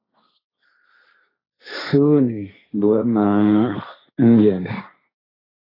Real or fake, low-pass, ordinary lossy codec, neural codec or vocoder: fake; 5.4 kHz; AAC, 24 kbps; codec, 16 kHz, 1.1 kbps, Voila-Tokenizer